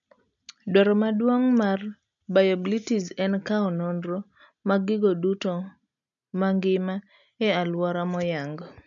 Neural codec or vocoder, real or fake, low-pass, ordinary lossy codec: none; real; 7.2 kHz; none